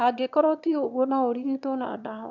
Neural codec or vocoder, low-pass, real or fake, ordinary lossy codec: autoencoder, 22.05 kHz, a latent of 192 numbers a frame, VITS, trained on one speaker; 7.2 kHz; fake; none